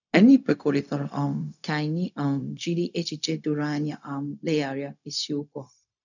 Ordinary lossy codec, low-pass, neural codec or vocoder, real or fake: none; 7.2 kHz; codec, 16 kHz, 0.4 kbps, LongCat-Audio-Codec; fake